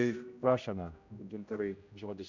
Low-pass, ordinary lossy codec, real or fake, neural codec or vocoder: 7.2 kHz; MP3, 64 kbps; fake; codec, 16 kHz, 0.5 kbps, X-Codec, HuBERT features, trained on general audio